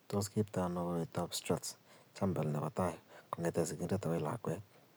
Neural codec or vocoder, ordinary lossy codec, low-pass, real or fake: none; none; none; real